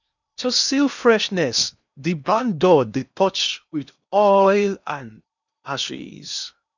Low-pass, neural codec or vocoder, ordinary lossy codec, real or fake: 7.2 kHz; codec, 16 kHz in and 24 kHz out, 0.8 kbps, FocalCodec, streaming, 65536 codes; none; fake